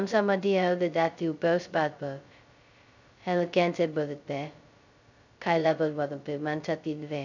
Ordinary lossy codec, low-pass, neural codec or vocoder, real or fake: none; 7.2 kHz; codec, 16 kHz, 0.2 kbps, FocalCodec; fake